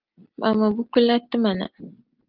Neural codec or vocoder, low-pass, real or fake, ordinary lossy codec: none; 5.4 kHz; real; Opus, 32 kbps